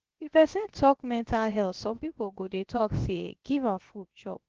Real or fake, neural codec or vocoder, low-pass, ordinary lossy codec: fake; codec, 16 kHz, about 1 kbps, DyCAST, with the encoder's durations; 7.2 kHz; Opus, 16 kbps